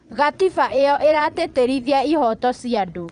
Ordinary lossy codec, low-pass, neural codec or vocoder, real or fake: none; 9.9 kHz; vocoder, 22.05 kHz, 80 mel bands, Vocos; fake